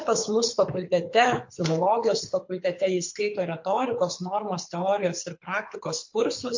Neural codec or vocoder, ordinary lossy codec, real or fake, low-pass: codec, 24 kHz, 6 kbps, HILCodec; MP3, 48 kbps; fake; 7.2 kHz